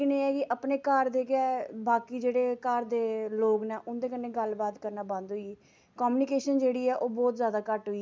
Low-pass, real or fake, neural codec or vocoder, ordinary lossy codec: none; real; none; none